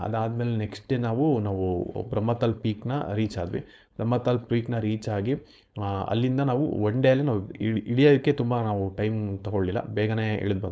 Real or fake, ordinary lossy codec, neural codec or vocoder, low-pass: fake; none; codec, 16 kHz, 4.8 kbps, FACodec; none